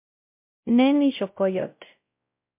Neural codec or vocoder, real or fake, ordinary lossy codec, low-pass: codec, 16 kHz, 0.5 kbps, X-Codec, WavLM features, trained on Multilingual LibriSpeech; fake; MP3, 32 kbps; 3.6 kHz